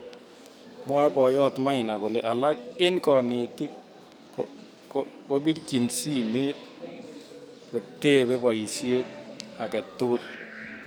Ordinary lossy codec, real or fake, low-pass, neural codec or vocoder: none; fake; none; codec, 44.1 kHz, 2.6 kbps, SNAC